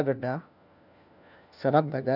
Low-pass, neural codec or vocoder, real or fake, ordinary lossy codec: 5.4 kHz; codec, 16 kHz, 1 kbps, FunCodec, trained on LibriTTS, 50 frames a second; fake; none